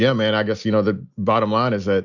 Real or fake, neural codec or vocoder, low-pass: real; none; 7.2 kHz